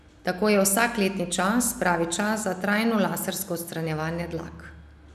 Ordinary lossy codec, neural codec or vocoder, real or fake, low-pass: none; none; real; 14.4 kHz